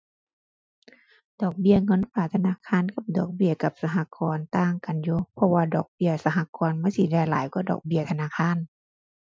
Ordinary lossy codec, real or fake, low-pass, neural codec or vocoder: none; real; none; none